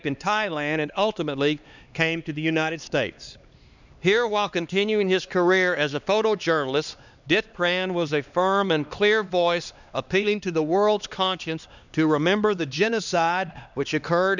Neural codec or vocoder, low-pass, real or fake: codec, 16 kHz, 2 kbps, X-Codec, HuBERT features, trained on LibriSpeech; 7.2 kHz; fake